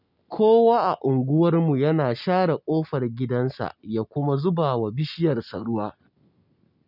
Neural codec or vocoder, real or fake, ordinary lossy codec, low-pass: none; real; none; 5.4 kHz